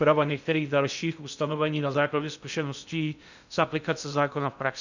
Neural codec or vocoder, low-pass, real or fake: codec, 16 kHz in and 24 kHz out, 0.6 kbps, FocalCodec, streaming, 2048 codes; 7.2 kHz; fake